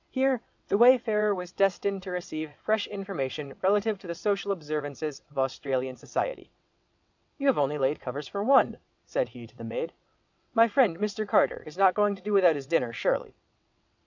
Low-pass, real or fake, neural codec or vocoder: 7.2 kHz; fake; vocoder, 22.05 kHz, 80 mel bands, WaveNeXt